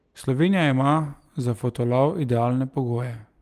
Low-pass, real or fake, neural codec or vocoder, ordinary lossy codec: 14.4 kHz; real; none; Opus, 24 kbps